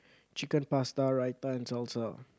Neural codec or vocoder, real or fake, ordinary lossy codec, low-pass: none; real; none; none